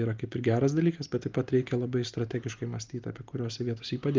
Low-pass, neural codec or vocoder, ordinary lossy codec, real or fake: 7.2 kHz; none; Opus, 32 kbps; real